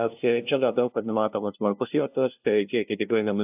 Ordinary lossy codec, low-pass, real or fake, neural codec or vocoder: AAC, 32 kbps; 3.6 kHz; fake; codec, 16 kHz, 0.5 kbps, FunCodec, trained on LibriTTS, 25 frames a second